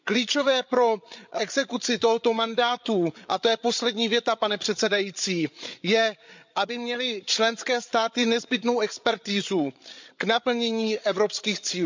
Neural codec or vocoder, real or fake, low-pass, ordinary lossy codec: codec, 16 kHz, 8 kbps, FreqCodec, larger model; fake; 7.2 kHz; MP3, 64 kbps